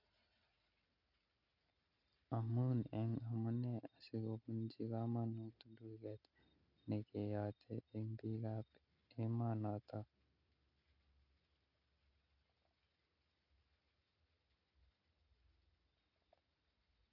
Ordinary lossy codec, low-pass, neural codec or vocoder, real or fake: none; 5.4 kHz; none; real